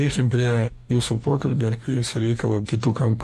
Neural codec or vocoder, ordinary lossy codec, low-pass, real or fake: codec, 44.1 kHz, 2.6 kbps, DAC; MP3, 96 kbps; 14.4 kHz; fake